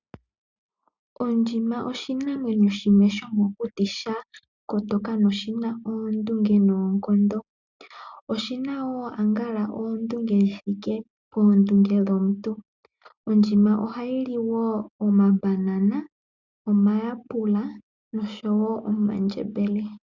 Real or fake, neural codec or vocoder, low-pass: real; none; 7.2 kHz